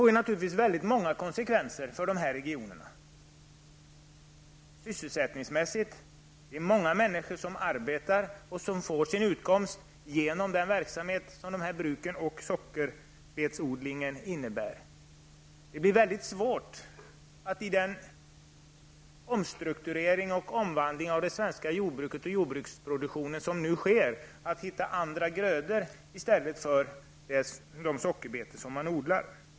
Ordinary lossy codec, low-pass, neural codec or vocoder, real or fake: none; none; none; real